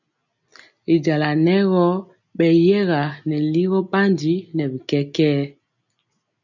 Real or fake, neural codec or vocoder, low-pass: real; none; 7.2 kHz